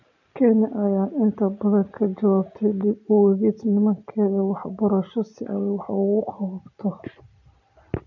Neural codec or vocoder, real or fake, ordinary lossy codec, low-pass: none; real; none; 7.2 kHz